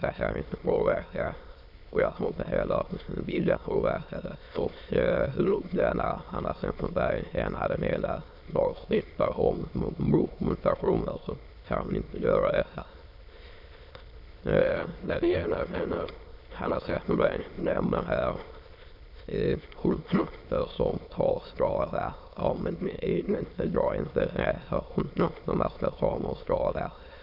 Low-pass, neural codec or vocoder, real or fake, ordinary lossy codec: 5.4 kHz; autoencoder, 22.05 kHz, a latent of 192 numbers a frame, VITS, trained on many speakers; fake; none